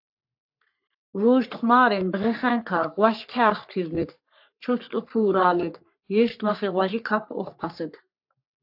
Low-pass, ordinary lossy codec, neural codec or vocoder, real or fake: 5.4 kHz; AAC, 48 kbps; codec, 44.1 kHz, 3.4 kbps, Pupu-Codec; fake